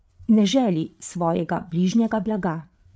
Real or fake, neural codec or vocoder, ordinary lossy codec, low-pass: fake; codec, 16 kHz, 8 kbps, FreqCodec, larger model; none; none